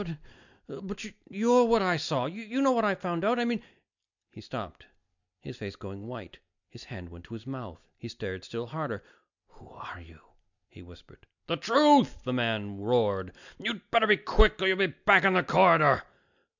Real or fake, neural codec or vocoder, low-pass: real; none; 7.2 kHz